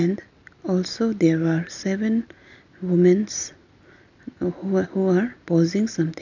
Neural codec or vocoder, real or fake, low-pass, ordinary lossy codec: none; real; 7.2 kHz; none